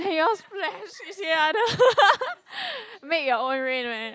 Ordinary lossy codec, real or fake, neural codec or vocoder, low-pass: none; real; none; none